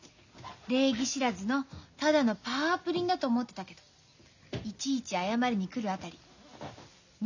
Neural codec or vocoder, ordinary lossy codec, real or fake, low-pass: none; none; real; 7.2 kHz